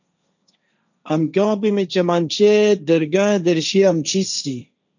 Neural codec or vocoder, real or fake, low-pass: codec, 16 kHz, 1.1 kbps, Voila-Tokenizer; fake; 7.2 kHz